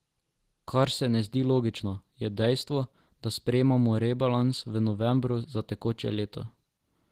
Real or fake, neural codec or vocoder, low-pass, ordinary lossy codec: real; none; 14.4 kHz; Opus, 16 kbps